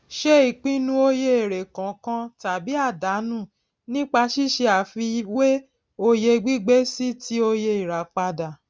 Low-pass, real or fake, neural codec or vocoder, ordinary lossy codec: none; real; none; none